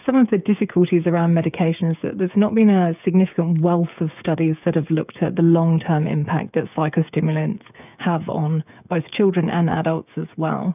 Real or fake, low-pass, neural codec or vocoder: fake; 3.6 kHz; codec, 16 kHz, 8 kbps, FreqCodec, smaller model